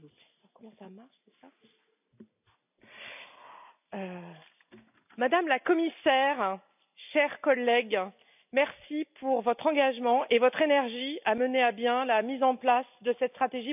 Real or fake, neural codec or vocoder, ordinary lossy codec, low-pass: real; none; none; 3.6 kHz